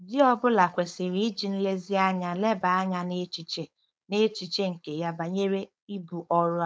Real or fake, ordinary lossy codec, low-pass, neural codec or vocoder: fake; none; none; codec, 16 kHz, 4.8 kbps, FACodec